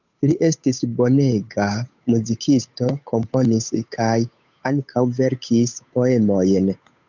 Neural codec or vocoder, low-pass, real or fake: codec, 16 kHz, 8 kbps, FunCodec, trained on Chinese and English, 25 frames a second; 7.2 kHz; fake